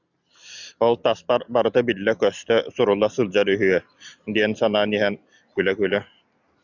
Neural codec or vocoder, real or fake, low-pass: none; real; 7.2 kHz